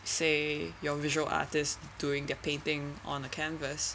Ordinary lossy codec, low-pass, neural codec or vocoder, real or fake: none; none; none; real